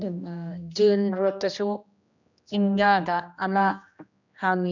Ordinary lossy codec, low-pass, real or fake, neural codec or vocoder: none; 7.2 kHz; fake; codec, 16 kHz, 1 kbps, X-Codec, HuBERT features, trained on general audio